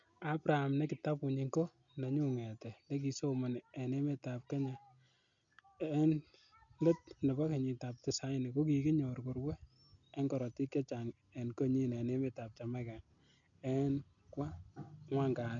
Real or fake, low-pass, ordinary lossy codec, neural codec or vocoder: real; 7.2 kHz; none; none